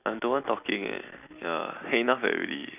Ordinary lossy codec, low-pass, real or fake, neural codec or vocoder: none; 3.6 kHz; real; none